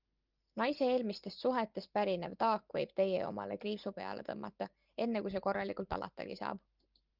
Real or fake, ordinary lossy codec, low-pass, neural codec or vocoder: real; Opus, 32 kbps; 5.4 kHz; none